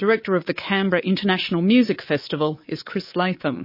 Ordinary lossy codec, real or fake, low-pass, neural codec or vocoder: MP3, 24 kbps; fake; 5.4 kHz; codec, 24 kHz, 3.1 kbps, DualCodec